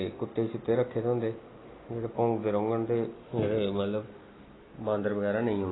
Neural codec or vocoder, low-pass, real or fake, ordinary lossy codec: none; 7.2 kHz; real; AAC, 16 kbps